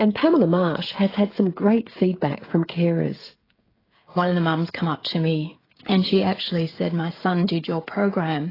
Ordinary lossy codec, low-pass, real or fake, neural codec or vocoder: AAC, 24 kbps; 5.4 kHz; fake; codec, 16 kHz, 16 kbps, FreqCodec, smaller model